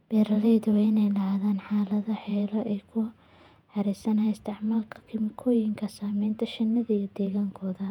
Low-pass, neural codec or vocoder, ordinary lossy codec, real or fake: 19.8 kHz; vocoder, 48 kHz, 128 mel bands, Vocos; none; fake